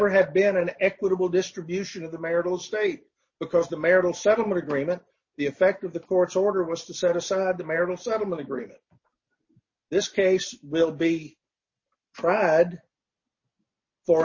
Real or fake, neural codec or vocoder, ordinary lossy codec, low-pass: real; none; MP3, 32 kbps; 7.2 kHz